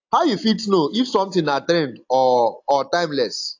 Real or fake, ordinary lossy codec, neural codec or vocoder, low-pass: real; AAC, 48 kbps; none; 7.2 kHz